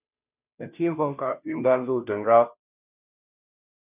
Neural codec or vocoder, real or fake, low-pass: codec, 16 kHz, 0.5 kbps, FunCodec, trained on Chinese and English, 25 frames a second; fake; 3.6 kHz